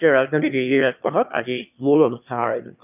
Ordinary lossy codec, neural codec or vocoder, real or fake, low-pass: none; autoencoder, 22.05 kHz, a latent of 192 numbers a frame, VITS, trained on one speaker; fake; 3.6 kHz